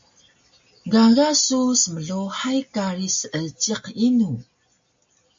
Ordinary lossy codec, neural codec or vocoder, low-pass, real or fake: MP3, 48 kbps; none; 7.2 kHz; real